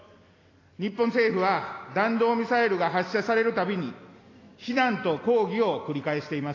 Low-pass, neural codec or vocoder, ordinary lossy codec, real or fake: 7.2 kHz; none; AAC, 32 kbps; real